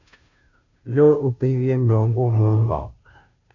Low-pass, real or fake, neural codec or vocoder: 7.2 kHz; fake; codec, 16 kHz, 0.5 kbps, FunCodec, trained on Chinese and English, 25 frames a second